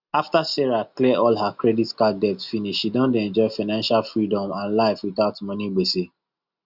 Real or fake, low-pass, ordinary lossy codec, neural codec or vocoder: real; 5.4 kHz; Opus, 64 kbps; none